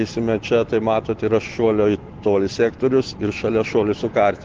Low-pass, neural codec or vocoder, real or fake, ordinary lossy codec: 7.2 kHz; none; real; Opus, 16 kbps